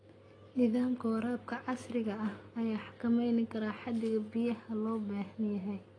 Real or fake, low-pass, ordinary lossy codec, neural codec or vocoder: real; 9.9 kHz; AAC, 32 kbps; none